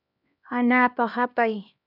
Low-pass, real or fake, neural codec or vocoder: 5.4 kHz; fake; codec, 16 kHz, 1 kbps, X-Codec, HuBERT features, trained on LibriSpeech